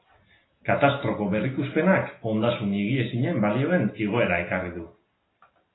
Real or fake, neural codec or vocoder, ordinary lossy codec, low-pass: real; none; AAC, 16 kbps; 7.2 kHz